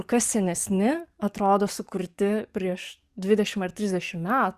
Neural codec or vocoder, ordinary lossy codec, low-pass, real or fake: codec, 44.1 kHz, 7.8 kbps, DAC; Opus, 64 kbps; 14.4 kHz; fake